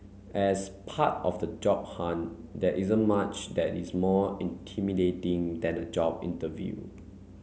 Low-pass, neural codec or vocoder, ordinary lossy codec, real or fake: none; none; none; real